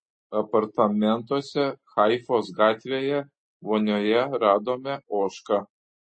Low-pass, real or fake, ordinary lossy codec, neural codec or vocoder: 9.9 kHz; real; MP3, 32 kbps; none